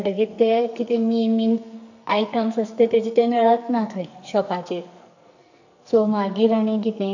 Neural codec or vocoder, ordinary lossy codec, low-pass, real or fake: codec, 44.1 kHz, 2.6 kbps, SNAC; none; 7.2 kHz; fake